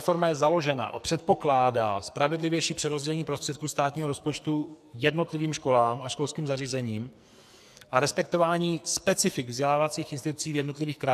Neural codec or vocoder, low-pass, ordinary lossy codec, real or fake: codec, 44.1 kHz, 2.6 kbps, SNAC; 14.4 kHz; AAC, 96 kbps; fake